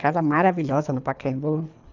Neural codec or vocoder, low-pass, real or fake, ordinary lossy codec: codec, 24 kHz, 3 kbps, HILCodec; 7.2 kHz; fake; none